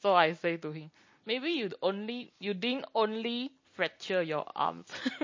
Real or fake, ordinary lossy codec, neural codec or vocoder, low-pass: real; MP3, 32 kbps; none; 7.2 kHz